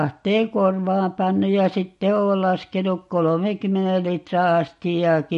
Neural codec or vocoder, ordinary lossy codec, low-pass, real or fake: none; MP3, 48 kbps; 14.4 kHz; real